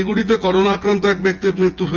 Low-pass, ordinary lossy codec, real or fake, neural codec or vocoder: 7.2 kHz; Opus, 32 kbps; fake; vocoder, 24 kHz, 100 mel bands, Vocos